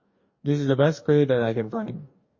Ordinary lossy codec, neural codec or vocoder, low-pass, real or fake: MP3, 32 kbps; codec, 44.1 kHz, 2.6 kbps, DAC; 7.2 kHz; fake